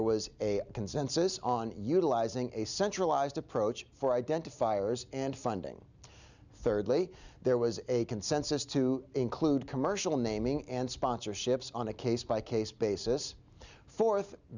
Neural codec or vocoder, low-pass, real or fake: none; 7.2 kHz; real